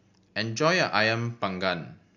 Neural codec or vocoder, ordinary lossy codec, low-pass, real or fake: none; none; 7.2 kHz; real